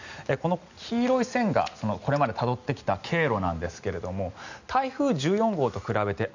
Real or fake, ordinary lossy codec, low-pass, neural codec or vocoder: real; none; 7.2 kHz; none